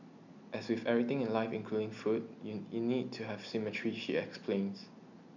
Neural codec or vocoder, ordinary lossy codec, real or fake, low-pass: none; none; real; 7.2 kHz